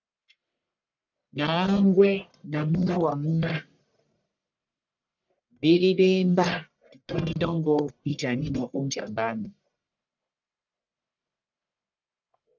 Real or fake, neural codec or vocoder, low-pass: fake; codec, 44.1 kHz, 1.7 kbps, Pupu-Codec; 7.2 kHz